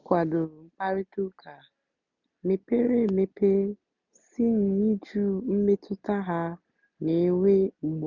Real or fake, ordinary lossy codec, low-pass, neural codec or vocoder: real; none; 7.2 kHz; none